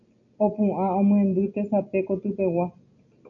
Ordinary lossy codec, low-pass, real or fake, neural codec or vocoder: MP3, 96 kbps; 7.2 kHz; real; none